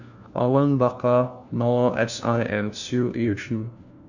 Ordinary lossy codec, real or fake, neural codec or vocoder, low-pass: none; fake; codec, 16 kHz, 1 kbps, FunCodec, trained on LibriTTS, 50 frames a second; 7.2 kHz